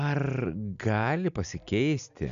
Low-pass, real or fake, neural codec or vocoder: 7.2 kHz; real; none